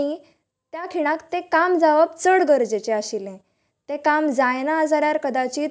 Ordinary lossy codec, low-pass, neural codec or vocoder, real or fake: none; none; none; real